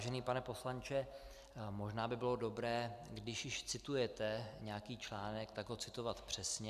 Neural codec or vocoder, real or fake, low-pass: none; real; 14.4 kHz